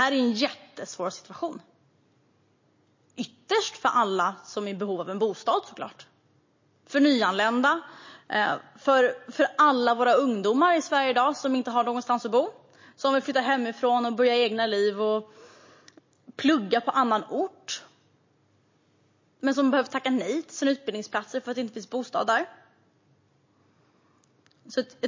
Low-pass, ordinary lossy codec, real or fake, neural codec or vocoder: 7.2 kHz; MP3, 32 kbps; real; none